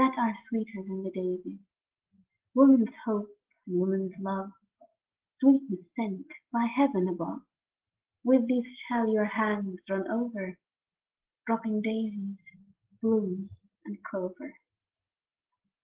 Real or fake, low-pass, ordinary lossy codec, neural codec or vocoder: real; 3.6 kHz; Opus, 16 kbps; none